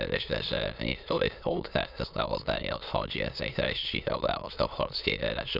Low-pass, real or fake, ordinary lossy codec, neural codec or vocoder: 5.4 kHz; fake; none; autoencoder, 22.05 kHz, a latent of 192 numbers a frame, VITS, trained on many speakers